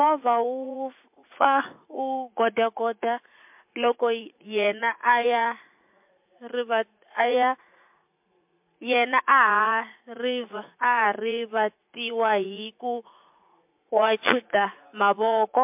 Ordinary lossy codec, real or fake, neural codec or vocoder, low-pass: MP3, 32 kbps; fake; vocoder, 44.1 kHz, 80 mel bands, Vocos; 3.6 kHz